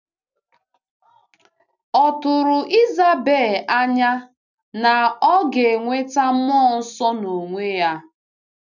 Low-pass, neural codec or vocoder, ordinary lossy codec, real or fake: 7.2 kHz; none; none; real